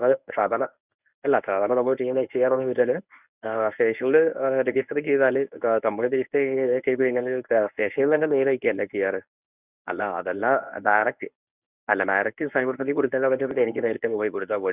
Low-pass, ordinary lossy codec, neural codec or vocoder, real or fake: 3.6 kHz; none; codec, 24 kHz, 0.9 kbps, WavTokenizer, medium speech release version 1; fake